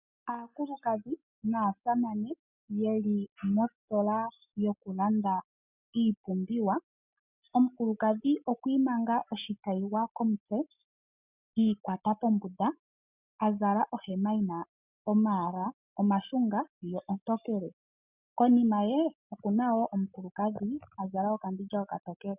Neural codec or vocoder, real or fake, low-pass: none; real; 3.6 kHz